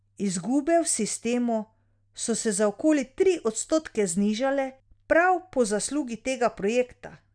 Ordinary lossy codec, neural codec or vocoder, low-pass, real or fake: none; none; 9.9 kHz; real